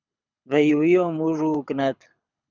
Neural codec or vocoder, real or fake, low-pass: codec, 24 kHz, 6 kbps, HILCodec; fake; 7.2 kHz